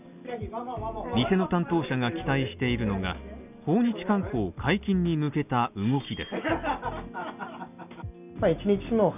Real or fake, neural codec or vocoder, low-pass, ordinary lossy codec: real; none; 3.6 kHz; none